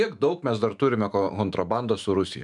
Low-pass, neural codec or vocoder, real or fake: 10.8 kHz; autoencoder, 48 kHz, 128 numbers a frame, DAC-VAE, trained on Japanese speech; fake